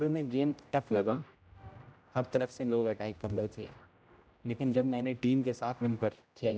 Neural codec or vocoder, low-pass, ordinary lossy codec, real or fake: codec, 16 kHz, 0.5 kbps, X-Codec, HuBERT features, trained on general audio; none; none; fake